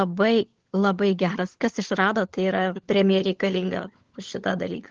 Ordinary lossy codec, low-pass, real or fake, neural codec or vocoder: Opus, 16 kbps; 7.2 kHz; fake; codec, 16 kHz, 16 kbps, FunCodec, trained on LibriTTS, 50 frames a second